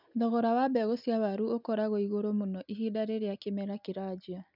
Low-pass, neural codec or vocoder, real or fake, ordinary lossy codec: 5.4 kHz; none; real; none